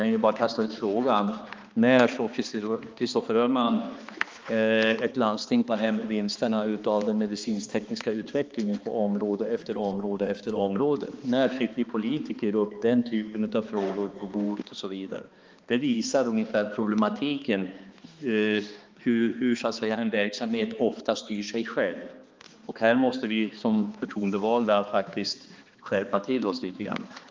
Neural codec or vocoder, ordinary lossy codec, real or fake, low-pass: codec, 16 kHz, 2 kbps, X-Codec, HuBERT features, trained on balanced general audio; Opus, 24 kbps; fake; 7.2 kHz